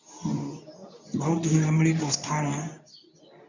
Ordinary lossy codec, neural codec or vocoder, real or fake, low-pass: none; codec, 24 kHz, 0.9 kbps, WavTokenizer, medium speech release version 2; fake; 7.2 kHz